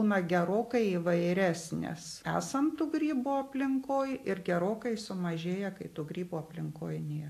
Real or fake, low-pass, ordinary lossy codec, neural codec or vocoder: real; 14.4 kHz; AAC, 64 kbps; none